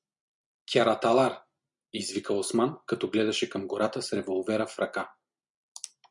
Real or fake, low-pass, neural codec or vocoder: real; 10.8 kHz; none